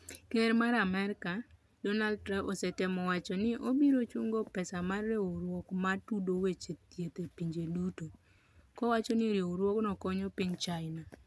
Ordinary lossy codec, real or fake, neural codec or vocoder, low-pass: none; real; none; none